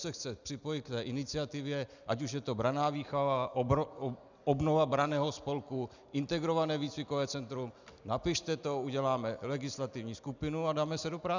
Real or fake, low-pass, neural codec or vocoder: real; 7.2 kHz; none